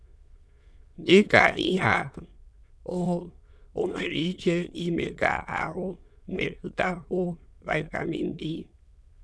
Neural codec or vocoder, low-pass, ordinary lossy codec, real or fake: autoencoder, 22.05 kHz, a latent of 192 numbers a frame, VITS, trained on many speakers; none; none; fake